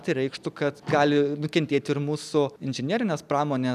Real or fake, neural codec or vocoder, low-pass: real; none; 14.4 kHz